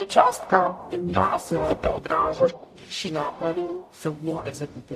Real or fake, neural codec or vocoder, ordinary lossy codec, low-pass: fake; codec, 44.1 kHz, 0.9 kbps, DAC; AAC, 64 kbps; 14.4 kHz